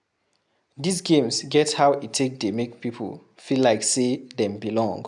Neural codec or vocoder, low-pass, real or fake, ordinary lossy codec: none; 10.8 kHz; real; none